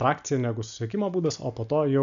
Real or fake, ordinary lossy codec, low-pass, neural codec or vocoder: real; MP3, 96 kbps; 7.2 kHz; none